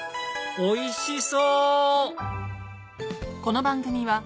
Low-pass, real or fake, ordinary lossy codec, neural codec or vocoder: none; real; none; none